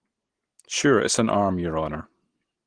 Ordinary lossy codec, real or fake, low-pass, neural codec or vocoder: Opus, 16 kbps; real; 9.9 kHz; none